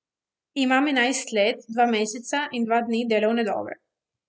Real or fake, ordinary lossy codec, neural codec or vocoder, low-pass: real; none; none; none